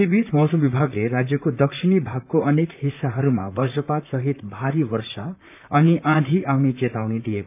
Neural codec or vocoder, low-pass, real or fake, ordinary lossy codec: vocoder, 44.1 kHz, 128 mel bands, Pupu-Vocoder; 3.6 kHz; fake; none